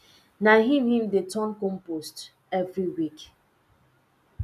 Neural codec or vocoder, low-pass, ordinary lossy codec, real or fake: none; 14.4 kHz; none; real